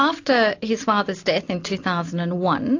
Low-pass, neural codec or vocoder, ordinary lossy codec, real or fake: 7.2 kHz; none; AAC, 48 kbps; real